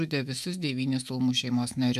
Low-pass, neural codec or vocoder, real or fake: 14.4 kHz; autoencoder, 48 kHz, 128 numbers a frame, DAC-VAE, trained on Japanese speech; fake